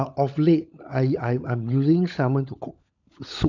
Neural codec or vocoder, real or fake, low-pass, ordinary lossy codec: codec, 16 kHz, 16 kbps, FunCodec, trained on LibriTTS, 50 frames a second; fake; 7.2 kHz; none